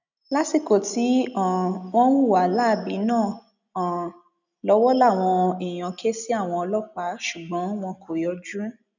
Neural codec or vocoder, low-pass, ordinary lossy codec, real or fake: none; 7.2 kHz; none; real